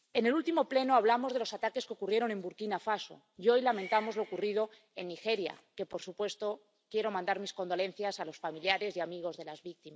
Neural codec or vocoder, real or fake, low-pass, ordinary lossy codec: none; real; none; none